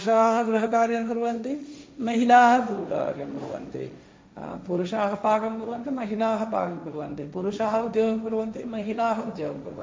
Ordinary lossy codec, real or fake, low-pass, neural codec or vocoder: none; fake; none; codec, 16 kHz, 1.1 kbps, Voila-Tokenizer